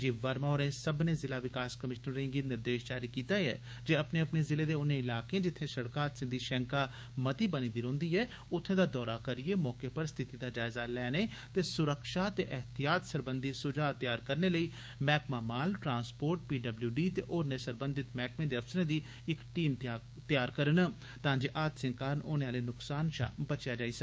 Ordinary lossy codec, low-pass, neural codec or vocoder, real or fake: none; none; codec, 16 kHz, 6 kbps, DAC; fake